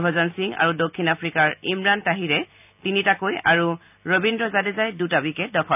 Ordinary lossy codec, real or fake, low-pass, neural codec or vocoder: none; real; 3.6 kHz; none